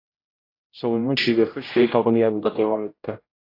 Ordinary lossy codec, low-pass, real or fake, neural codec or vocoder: AAC, 32 kbps; 5.4 kHz; fake; codec, 16 kHz, 0.5 kbps, X-Codec, HuBERT features, trained on general audio